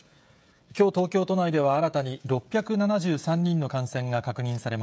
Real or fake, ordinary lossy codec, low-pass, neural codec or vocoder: fake; none; none; codec, 16 kHz, 16 kbps, FreqCodec, smaller model